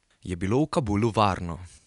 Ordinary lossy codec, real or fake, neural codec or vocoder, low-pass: none; real; none; 10.8 kHz